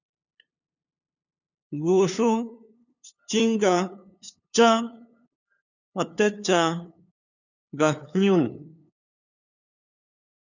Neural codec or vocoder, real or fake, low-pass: codec, 16 kHz, 2 kbps, FunCodec, trained on LibriTTS, 25 frames a second; fake; 7.2 kHz